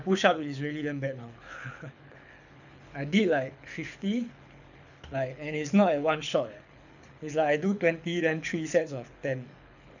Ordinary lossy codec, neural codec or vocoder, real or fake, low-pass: none; codec, 24 kHz, 6 kbps, HILCodec; fake; 7.2 kHz